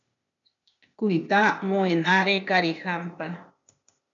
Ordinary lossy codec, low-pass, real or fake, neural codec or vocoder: MP3, 96 kbps; 7.2 kHz; fake; codec, 16 kHz, 0.8 kbps, ZipCodec